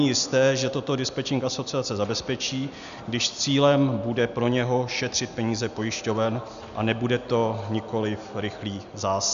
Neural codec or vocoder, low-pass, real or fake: none; 7.2 kHz; real